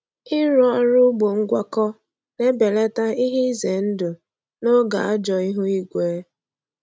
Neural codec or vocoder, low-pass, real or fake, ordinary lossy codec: none; none; real; none